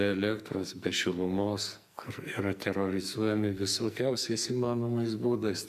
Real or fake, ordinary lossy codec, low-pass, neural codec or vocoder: fake; MP3, 96 kbps; 14.4 kHz; codec, 44.1 kHz, 2.6 kbps, SNAC